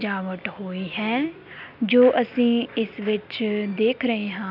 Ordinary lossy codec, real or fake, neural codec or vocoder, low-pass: none; real; none; 5.4 kHz